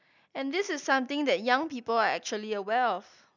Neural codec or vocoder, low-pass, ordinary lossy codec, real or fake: none; 7.2 kHz; none; real